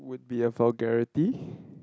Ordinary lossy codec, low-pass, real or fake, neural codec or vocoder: none; none; real; none